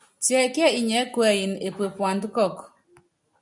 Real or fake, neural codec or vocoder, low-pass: real; none; 10.8 kHz